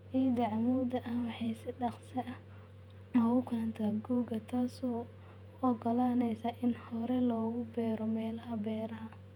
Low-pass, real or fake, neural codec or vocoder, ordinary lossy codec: 19.8 kHz; fake; vocoder, 48 kHz, 128 mel bands, Vocos; none